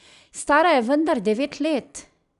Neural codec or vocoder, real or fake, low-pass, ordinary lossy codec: none; real; 10.8 kHz; none